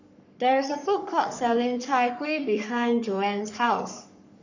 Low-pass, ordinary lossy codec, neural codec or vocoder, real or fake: 7.2 kHz; none; codec, 44.1 kHz, 3.4 kbps, Pupu-Codec; fake